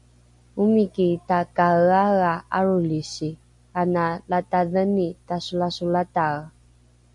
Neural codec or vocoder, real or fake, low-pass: none; real; 10.8 kHz